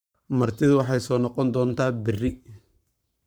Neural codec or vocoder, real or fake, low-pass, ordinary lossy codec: codec, 44.1 kHz, 7.8 kbps, Pupu-Codec; fake; none; none